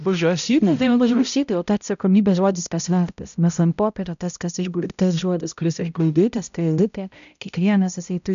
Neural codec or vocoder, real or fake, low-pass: codec, 16 kHz, 0.5 kbps, X-Codec, HuBERT features, trained on balanced general audio; fake; 7.2 kHz